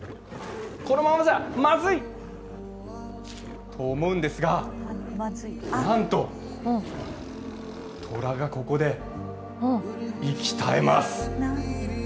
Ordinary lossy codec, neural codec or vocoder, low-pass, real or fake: none; none; none; real